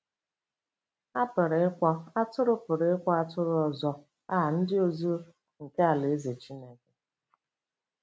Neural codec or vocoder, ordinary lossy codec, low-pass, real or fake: none; none; none; real